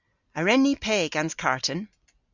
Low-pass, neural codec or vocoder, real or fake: 7.2 kHz; none; real